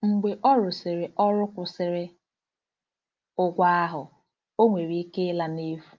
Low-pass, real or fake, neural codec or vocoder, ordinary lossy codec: 7.2 kHz; real; none; Opus, 24 kbps